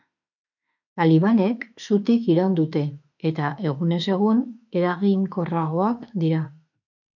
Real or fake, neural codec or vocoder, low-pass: fake; autoencoder, 48 kHz, 32 numbers a frame, DAC-VAE, trained on Japanese speech; 7.2 kHz